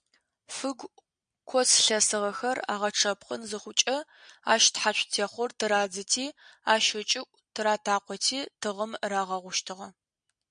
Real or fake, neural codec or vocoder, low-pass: real; none; 9.9 kHz